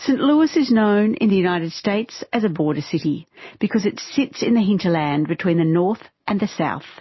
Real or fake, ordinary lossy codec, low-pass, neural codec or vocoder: real; MP3, 24 kbps; 7.2 kHz; none